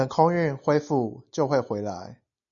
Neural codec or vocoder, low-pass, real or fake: none; 7.2 kHz; real